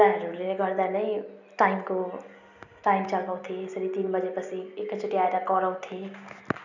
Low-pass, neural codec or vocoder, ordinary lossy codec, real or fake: 7.2 kHz; none; none; real